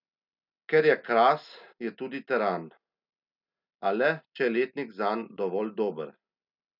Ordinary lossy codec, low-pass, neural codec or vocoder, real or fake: none; 5.4 kHz; none; real